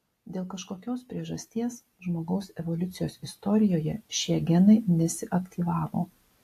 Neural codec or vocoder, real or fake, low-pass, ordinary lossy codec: none; real; 14.4 kHz; AAC, 64 kbps